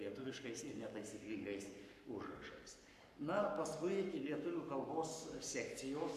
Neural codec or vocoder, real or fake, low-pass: codec, 44.1 kHz, 7.8 kbps, Pupu-Codec; fake; 14.4 kHz